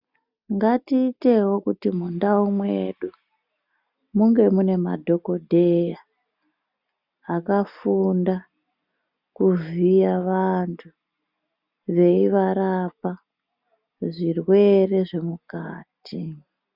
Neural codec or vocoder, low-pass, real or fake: none; 5.4 kHz; real